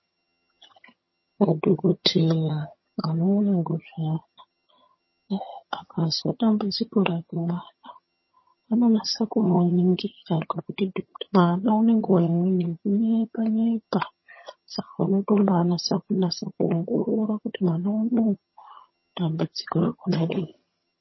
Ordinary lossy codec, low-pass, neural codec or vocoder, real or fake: MP3, 24 kbps; 7.2 kHz; vocoder, 22.05 kHz, 80 mel bands, HiFi-GAN; fake